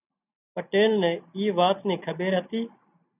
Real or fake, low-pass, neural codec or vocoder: real; 3.6 kHz; none